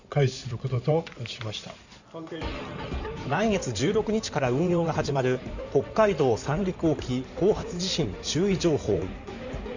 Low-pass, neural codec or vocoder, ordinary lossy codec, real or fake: 7.2 kHz; codec, 16 kHz in and 24 kHz out, 2.2 kbps, FireRedTTS-2 codec; none; fake